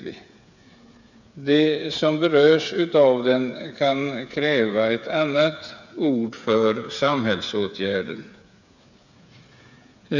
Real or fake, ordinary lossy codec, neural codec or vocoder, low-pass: fake; none; codec, 16 kHz, 8 kbps, FreqCodec, smaller model; 7.2 kHz